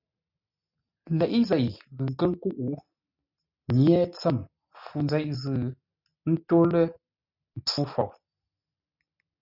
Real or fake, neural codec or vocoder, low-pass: real; none; 5.4 kHz